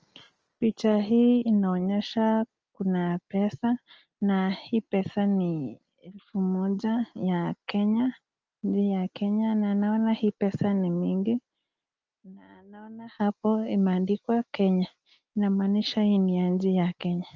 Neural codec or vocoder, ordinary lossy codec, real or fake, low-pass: none; Opus, 24 kbps; real; 7.2 kHz